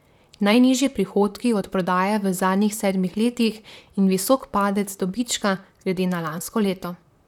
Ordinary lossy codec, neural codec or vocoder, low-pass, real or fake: none; vocoder, 44.1 kHz, 128 mel bands, Pupu-Vocoder; 19.8 kHz; fake